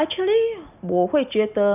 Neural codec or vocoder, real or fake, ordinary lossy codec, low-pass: none; real; none; 3.6 kHz